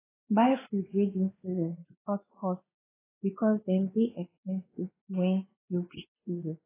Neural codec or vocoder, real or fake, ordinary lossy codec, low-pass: codec, 16 kHz, 2 kbps, X-Codec, WavLM features, trained on Multilingual LibriSpeech; fake; AAC, 16 kbps; 3.6 kHz